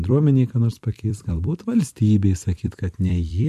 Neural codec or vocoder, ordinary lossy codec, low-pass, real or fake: vocoder, 44.1 kHz, 128 mel bands every 256 samples, BigVGAN v2; MP3, 64 kbps; 14.4 kHz; fake